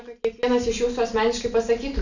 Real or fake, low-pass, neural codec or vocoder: real; 7.2 kHz; none